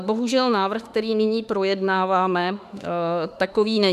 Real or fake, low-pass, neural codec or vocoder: fake; 14.4 kHz; autoencoder, 48 kHz, 32 numbers a frame, DAC-VAE, trained on Japanese speech